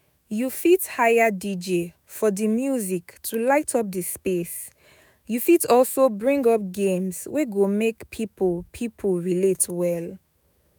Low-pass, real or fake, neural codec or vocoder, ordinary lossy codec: none; fake; autoencoder, 48 kHz, 128 numbers a frame, DAC-VAE, trained on Japanese speech; none